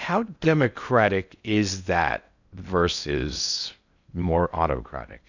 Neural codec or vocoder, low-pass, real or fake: codec, 16 kHz in and 24 kHz out, 0.6 kbps, FocalCodec, streaming, 2048 codes; 7.2 kHz; fake